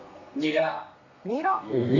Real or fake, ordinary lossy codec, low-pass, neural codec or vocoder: fake; Opus, 64 kbps; 7.2 kHz; codec, 32 kHz, 1.9 kbps, SNAC